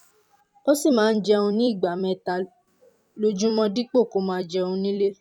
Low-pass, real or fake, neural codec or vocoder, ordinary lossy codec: 19.8 kHz; fake; vocoder, 44.1 kHz, 128 mel bands every 256 samples, BigVGAN v2; none